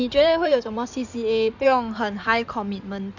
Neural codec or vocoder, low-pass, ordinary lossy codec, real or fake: codec, 16 kHz in and 24 kHz out, 2.2 kbps, FireRedTTS-2 codec; 7.2 kHz; none; fake